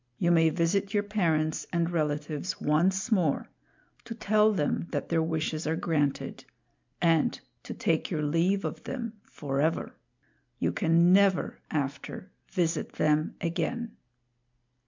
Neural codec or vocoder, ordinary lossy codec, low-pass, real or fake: none; MP3, 64 kbps; 7.2 kHz; real